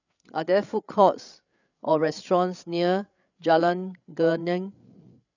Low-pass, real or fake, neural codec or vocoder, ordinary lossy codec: 7.2 kHz; fake; codec, 16 kHz, 8 kbps, FreqCodec, larger model; none